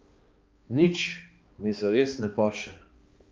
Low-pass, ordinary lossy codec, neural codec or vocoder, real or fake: 7.2 kHz; Opus, 32 kbps; codec, 16 kHz, 2 kbps, X-Codec, HuBERT features, trained on balanced general audio; fake